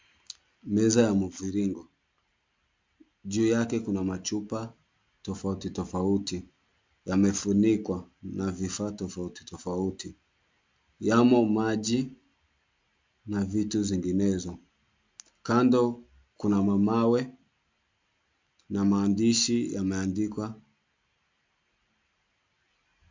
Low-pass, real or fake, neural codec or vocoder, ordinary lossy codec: 7.2 kHz; real; none; MP3, 64 kbps